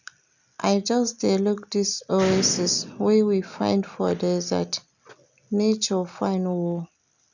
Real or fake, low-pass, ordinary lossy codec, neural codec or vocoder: real; 7.2 kHz; none; none